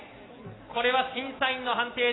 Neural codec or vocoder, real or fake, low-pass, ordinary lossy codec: none; real; 7.2 kHz; AAC, 16 kbps